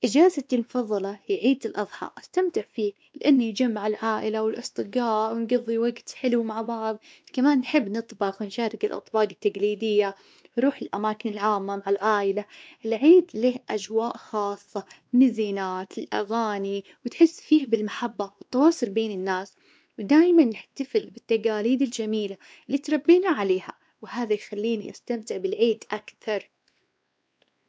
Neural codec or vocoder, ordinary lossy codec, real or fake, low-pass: codec, 16 kHz, 2 kbps, X-Codec, WavLM features, trained on Multilingual LibriSpeech; none; fake; none